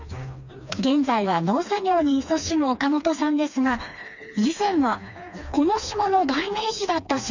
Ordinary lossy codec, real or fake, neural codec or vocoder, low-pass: none; fake; codec, 16 kHz, 2 kbps, FreqCodec, smaller model; 7.2 kHz